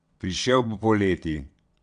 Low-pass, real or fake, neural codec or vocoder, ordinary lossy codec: 9.9 kHz; fake; vocoder, 22.05 kHz, 80 mel bands, WaveNeXt; none